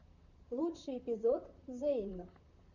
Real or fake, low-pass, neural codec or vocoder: fake; 7.2 kHz; vocoder, 44.1 kHz, 128 mel bands, Pupu-Vocoder